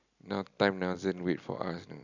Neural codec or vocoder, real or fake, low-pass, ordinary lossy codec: none; real; 7.2 kHz; none